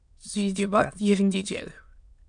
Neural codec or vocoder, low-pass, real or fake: autoencoder, 22.05 kHz, a latent of 192 numbers a frame, VITS, trained on many speakers; 9.9 kHz; fake